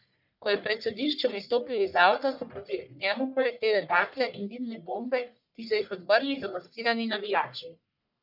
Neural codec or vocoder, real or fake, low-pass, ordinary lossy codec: codec, 44.1 kHz, 1.7 kbps, Pupu-Codec; fake; 5.4 kHz; none